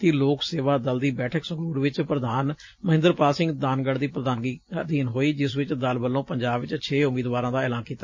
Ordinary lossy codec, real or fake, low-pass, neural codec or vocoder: MP3, 32 kbps; real; 7.2 kHz; none